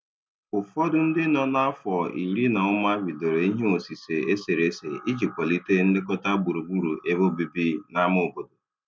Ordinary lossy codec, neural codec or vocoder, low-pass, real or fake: none; none; none; real